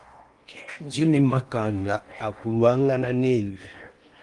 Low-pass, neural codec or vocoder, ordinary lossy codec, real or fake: 10.8 kHz; codec, 16 kHz in and 24 kHz out, 0.8 kbps, FocalCodec, streaming, 65536 codes; Opus, 32 kbps; fake